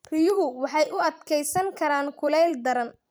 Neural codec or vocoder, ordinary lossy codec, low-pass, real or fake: vocoder, 44.1 kHz, 128 mel bands every 256 samples, BigVGAN v2; none; none; fake